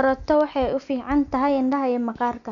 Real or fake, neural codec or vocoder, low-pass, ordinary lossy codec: real; none; 7.2 kHz; none